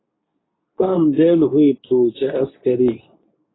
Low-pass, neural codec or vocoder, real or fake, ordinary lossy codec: 7.2 kHz; codec, 24 kHz, 0.9 kbps, WavTokenizer, medium speech release version 2; fake; AAC, 16 kbps